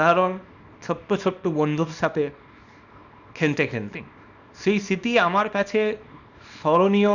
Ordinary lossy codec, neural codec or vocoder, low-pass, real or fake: none; codec, 24 kHz, 0.9 kbps, WavTokenizer, small release; 7.2 kHz; fake